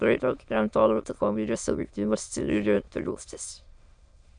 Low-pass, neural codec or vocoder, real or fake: 9.9 kHz; autoencoder, 22.05 kHz, a latent of 192 numbers a frame, VITS, trained on many speakers; fake